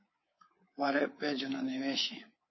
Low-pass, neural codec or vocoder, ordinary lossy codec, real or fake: 7.2 kHz; codec, 24 kHz, 3.1 kbps, DualCodec; MP3, 24 kbps; fake